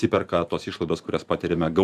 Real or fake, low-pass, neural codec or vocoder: fake; 14.4 kHz; vocoder, 44.1 kHz, 128 mel bands every 512 samples, BigVGAN v2